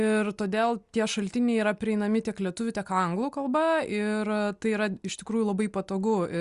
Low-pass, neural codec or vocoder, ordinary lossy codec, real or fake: 10.8 kHz; none; Opus, 64 kbps; real